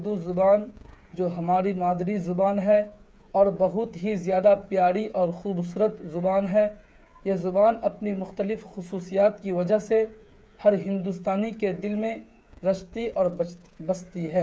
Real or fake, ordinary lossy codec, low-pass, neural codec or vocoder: fake; none; none; codec, 16 kHz, 8 kbps, FreqCodec, smaller model